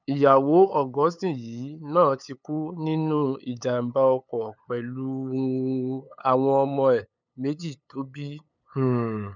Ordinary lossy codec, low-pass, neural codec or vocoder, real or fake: none; 7.2 kHz; codec, 16 kHz, 8 kbps, FunCodec, trained on LibriTTS, 25 frames a second; fake